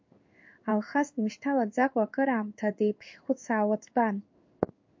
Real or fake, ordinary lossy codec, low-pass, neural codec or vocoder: fake; MP3, 48 kbps; 7.2 kHz; codec, 16 kHz in and 24 kHz out, 1 kbps, XY-Tokenizer